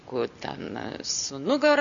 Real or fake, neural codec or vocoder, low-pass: real; none; 7.2 kHz